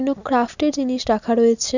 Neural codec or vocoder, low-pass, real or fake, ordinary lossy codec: none; 7.2 kHz; real; none